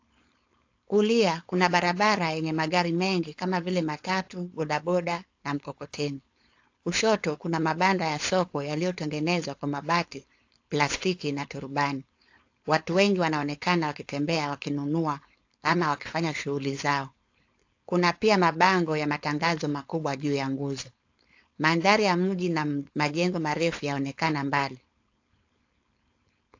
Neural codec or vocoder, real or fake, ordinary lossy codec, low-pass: codec, 16 kHz, 4.8 kbps, FACodec; fake; AAC, 48 kbps; 7.2 kHz